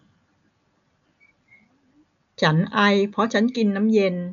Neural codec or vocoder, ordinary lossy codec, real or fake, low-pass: none; none; real; 7.2 kHz